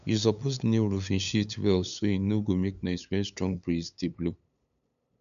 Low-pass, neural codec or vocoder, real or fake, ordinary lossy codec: 7.2 kHz; codec, 16 kHz, 8 kbps, FunCodec, trained on LibriTTS, 25 frames a second; fake; none